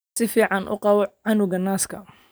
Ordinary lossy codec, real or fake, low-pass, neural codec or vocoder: none; real; none; none